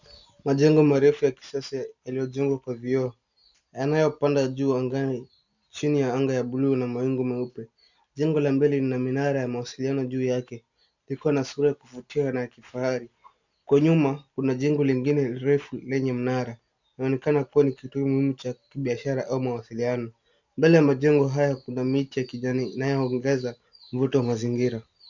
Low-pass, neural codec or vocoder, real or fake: 7.2 kHz; none; real